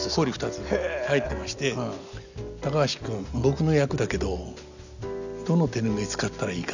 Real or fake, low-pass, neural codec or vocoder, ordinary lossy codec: real; 7.2 kHz; none; none